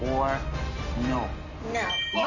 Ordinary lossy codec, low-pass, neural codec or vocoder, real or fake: none; 7.2 kHz; none; real